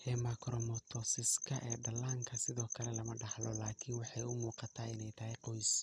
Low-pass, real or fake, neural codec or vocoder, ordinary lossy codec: none; real; none; none